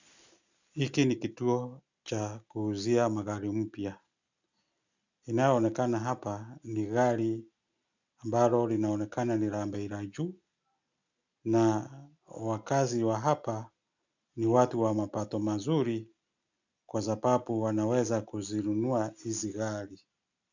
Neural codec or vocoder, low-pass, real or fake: none; 7.2 kHz; real